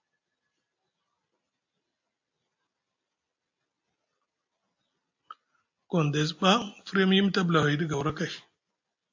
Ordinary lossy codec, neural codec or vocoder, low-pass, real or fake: AAC, 48 kbps; none; 7.2 kHz; real